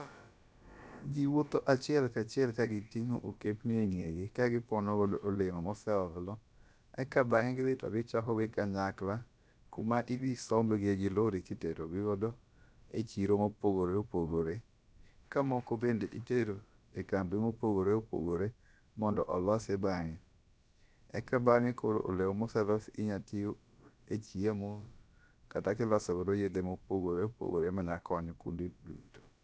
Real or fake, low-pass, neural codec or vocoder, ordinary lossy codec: fake; none; codec, 16 kHz, about 1 kbps, DyCAST, with the encoder's durations; none